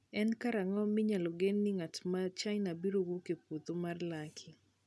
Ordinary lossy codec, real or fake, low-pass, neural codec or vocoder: none; real; none; none